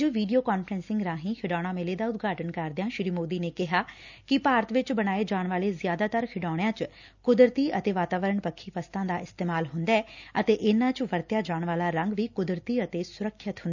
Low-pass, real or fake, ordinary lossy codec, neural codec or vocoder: 7.2 kHz; real; none; none